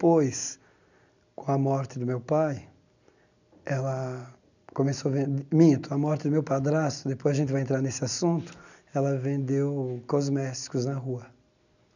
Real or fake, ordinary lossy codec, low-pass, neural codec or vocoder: real; none; 7.2 kHz; none